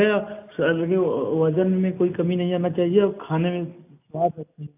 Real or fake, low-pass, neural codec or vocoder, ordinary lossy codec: real; 3.6 kHz; none; AAC, 32 kbps